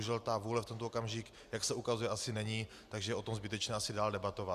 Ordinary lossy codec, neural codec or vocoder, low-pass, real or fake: Opus, 64 kbps; none; 14.4 kHz; real